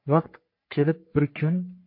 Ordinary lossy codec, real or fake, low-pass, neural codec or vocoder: MP3, 32 kbps; fake; 5.4 kHz; codec, 44.1 kHz, 3.4 kbps, Pupu-Codec